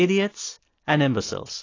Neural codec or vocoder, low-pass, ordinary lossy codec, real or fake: none; 7.2 kHz; AAC, 32 kbps; real